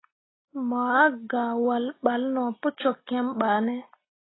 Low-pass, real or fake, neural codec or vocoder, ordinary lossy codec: 7.2 kHz; real; none; AAC, 16 kbps